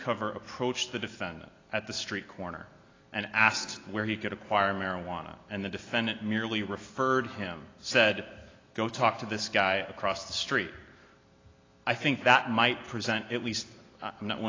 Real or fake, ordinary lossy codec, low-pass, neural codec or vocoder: real; AAC, 32 kbps; 7.2 kHz; none